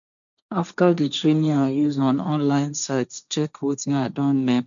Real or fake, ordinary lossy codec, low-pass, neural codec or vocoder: fake; none; 7.2 kHz; codec, 16 kHz, 1.1 kbps, Voila-Tokenizer